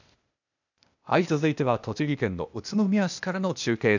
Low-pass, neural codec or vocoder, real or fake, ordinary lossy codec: 7.2 kHz; codec, 16 kHz, 0.8 kbps, ZipCodec; fake; none